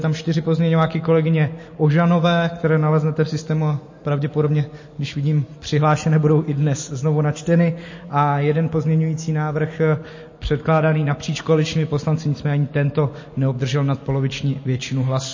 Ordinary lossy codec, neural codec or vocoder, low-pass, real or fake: MP3, 32 kbps; none; 7.2 kHz; real